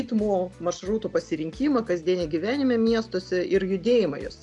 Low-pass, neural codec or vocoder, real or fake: 10.8 kHz; none; real